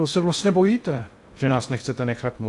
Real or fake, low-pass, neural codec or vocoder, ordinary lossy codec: fake; 10.8 kHz; codec, 16 kHz in and 24 kHz out, 0.6 kbps, FocalCodec, streaming, 2048 codes; AAC, 64 kbps